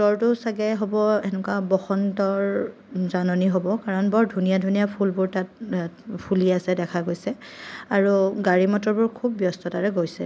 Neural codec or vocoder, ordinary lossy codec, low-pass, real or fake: none; none; none; real